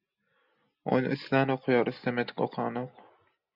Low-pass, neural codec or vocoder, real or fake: 5.4 kHz; none; real